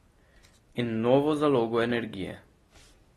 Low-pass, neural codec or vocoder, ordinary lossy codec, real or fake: 19.8 kHz; none; AAC, 32 kbps; real